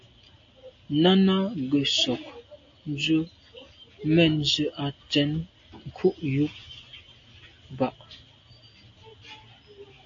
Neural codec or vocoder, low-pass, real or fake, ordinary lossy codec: none; 7.2 kHz; real; AAC, 48 kbps